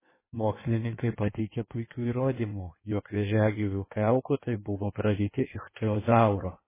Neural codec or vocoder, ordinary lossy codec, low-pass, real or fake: codec, 16 kHz in and 24 kHz out, 1.1 kbps, FireRedTTS-2 codec; MP3, 16 kbps; 3.6 kHz; fake